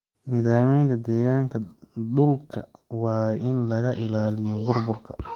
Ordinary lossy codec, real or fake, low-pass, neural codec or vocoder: Opus, 24 kbps; fake; 19.8 kHz; autoencoder, 48 kHz, 32 numbers a frame, DAC-VAE, trained on Japanese speech